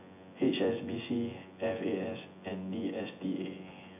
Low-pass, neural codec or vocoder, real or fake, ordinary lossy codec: 3.6 kHz; vocoder, 24 kHz, 100 mel bands, Vocos; fake; MP3, 32 kbps